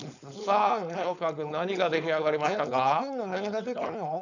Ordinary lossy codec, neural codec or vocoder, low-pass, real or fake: none; codec, 16 kHz, 4.8 kbps, FACodec; 7.2 kHz; fake